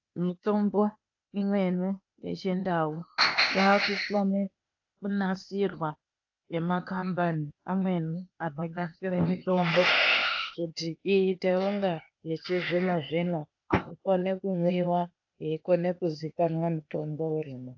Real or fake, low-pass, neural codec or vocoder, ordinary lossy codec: fake; 7.2 kHz; codec, 16 kHz, 0.8 kbps, ZipCodec; AAC, 48 kbps